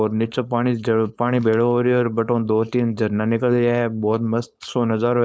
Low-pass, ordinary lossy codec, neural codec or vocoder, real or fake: none; none; codec, 16 kHz, 4.8 kbps, FACodec; fake